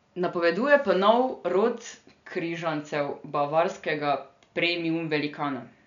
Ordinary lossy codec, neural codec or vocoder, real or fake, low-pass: none; none; real; 7.2 kHz